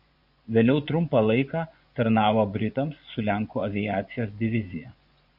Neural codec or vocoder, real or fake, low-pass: vocoder, 24 kHz, 100 mel bands, Vocos; fake; 5.4 kHz